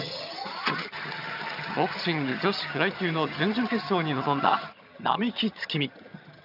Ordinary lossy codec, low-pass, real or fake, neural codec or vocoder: none; 5.4 kHz; fake; vocoder, 22.05 kHz, 80 mel bands, HiFi-GAN